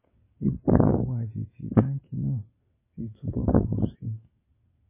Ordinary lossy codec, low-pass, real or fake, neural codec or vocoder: MP3, 16 kbps; 3.6 kHz; fake; vocoder, 22.05 kHz, 80 mel bands, WaveNeXt